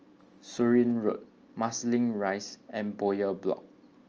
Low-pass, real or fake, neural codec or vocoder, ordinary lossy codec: 7.2 kHz; real; none; Opus, 24 kbps